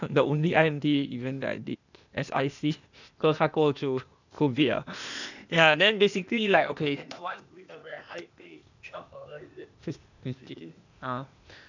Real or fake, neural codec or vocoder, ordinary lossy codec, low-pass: fake; codec, 16 kHz, 0.8 kbps, ZipCodec; none; 7.2 kHz